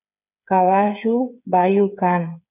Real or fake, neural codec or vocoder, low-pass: fake; codec, 16 kHz, 8 kbps, FreqCodec, smaller model; 3.6 kHz